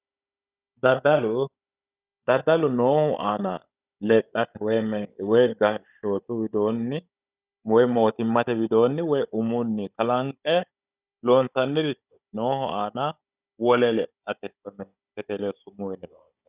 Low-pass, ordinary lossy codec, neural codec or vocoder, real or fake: 3.6 kHz; Opus, 64 kbps; codec, 16 kHz, 16 kbps, FunCodec, trained on Chinese and English, 50 frames a second; fake